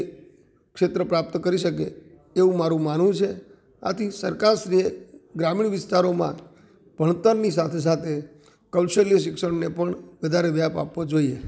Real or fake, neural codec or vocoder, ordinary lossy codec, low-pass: real; none; none; none